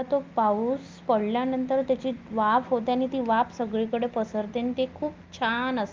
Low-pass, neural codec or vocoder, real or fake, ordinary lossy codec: 7.2 kHz; none; real; Opus, 32 kbps